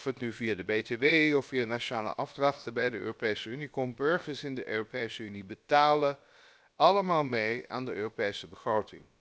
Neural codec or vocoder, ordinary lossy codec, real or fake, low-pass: codec, 16 kHz, about 1 kbps, DyCAST, with the encoder's durations; none; fake; none